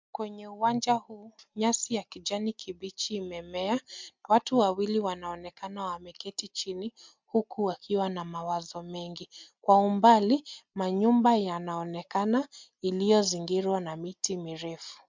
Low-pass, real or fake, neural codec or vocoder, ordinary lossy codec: 7.2 kHz; real; none; MP3, 64 kbps